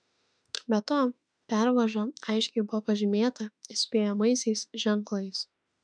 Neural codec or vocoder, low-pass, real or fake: autoencoder, 48 kHz, 32 numbers a frame, DAC-VAE, trained on Japanese speech; 9.9 kHz; fake